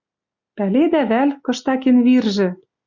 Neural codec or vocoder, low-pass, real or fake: none; 7.2 kHz; real